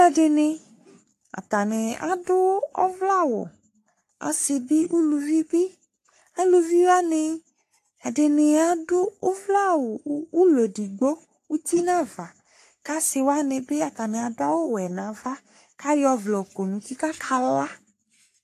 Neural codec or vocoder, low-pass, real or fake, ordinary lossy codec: codec, 44.1 kHz, 3.4 kbps, Pupu-Codec; 14.4 kHz; fake; AAC, 64 kbps